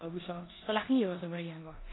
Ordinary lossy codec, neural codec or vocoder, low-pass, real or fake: AAC, 16 kbps; codec, 16 kHz in and 24 kHz out, 0.9 kbps, LongCat-Audio-Codec, four codebook decoder; 7.2 kHz; fake